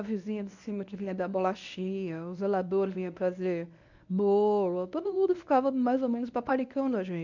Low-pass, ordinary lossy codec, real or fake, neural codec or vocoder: 7.2 kHz; none; fake; codec, 24 kHz, 0.9 kbps, WavTokenizer, medium speech release version 1